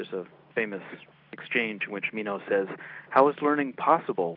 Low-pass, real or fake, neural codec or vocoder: 5.4 kHz; real; none